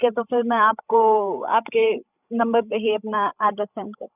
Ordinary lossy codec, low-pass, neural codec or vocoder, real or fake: none; 3.6 kHz; codec, 16 kHz, 16 kbps, FreqCodec, larger model; fake